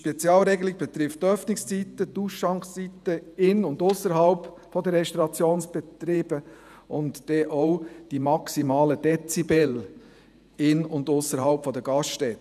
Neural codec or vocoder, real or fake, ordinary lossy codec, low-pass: vocoder, 48 kHz, 128 mel bands, Vocos; fake; none; 14.4 kHz